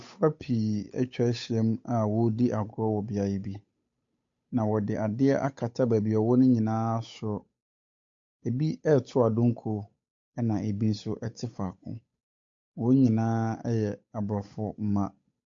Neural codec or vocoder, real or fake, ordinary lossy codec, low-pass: codec, 16 kHz, 8 kbps, FunCodec, trained on Chinese and English, 25 frames a second; fake; MP3, 48 kbps; 7.2 kHz